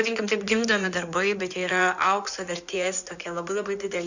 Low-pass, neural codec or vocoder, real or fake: 7.2 kHz; codec, 16 kHz in and 24 kHz out, 2.2 kbps, FireRedTTS-2 codec; fake